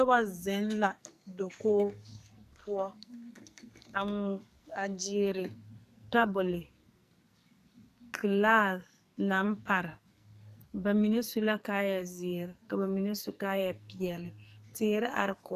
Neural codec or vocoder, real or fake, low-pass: codec, 44.1 kHz, 2.6 kbps, SNAC; fake; 14.4 kHz